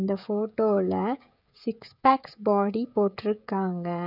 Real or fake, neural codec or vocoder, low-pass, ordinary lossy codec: fake; codec, 16 kHz, 16 kbps, FreqCodec, smaller model; 5.4 kHz; none